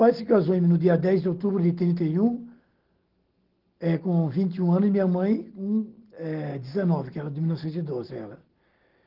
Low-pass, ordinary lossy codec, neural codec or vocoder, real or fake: 5.4 kHz; Opus, 16 kbps; none; real